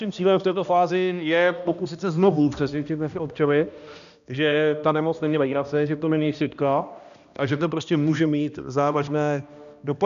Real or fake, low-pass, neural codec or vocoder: fake; 7.2 kHz; codec, 16 kHz, 1 kbps, X-Codec, HuBERT features, trained on balanced general audio